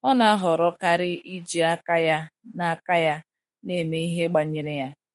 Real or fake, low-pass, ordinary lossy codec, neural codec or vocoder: fake; 19.8 kHz; MP3, 48 kbps; autoencoder, 48 kHz, 32 numbers a frame, DAC-VAE, trained on Japanese speech